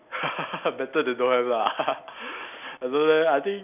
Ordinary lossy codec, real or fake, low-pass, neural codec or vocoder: none; real; 3.6 kHz; none